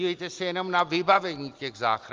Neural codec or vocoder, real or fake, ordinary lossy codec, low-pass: none; real; Opus, 32 kbps; 7.2 kHz